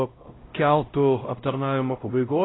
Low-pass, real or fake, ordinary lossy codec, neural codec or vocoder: 7.2 kHz; fake; AAC, 16 kbps; codec, 16 kHz, 0.5 kbps, X-Codec, HuBERT features, trained on LibriSpeech